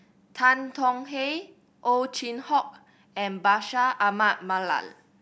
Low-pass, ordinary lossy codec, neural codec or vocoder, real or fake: none; none; none; real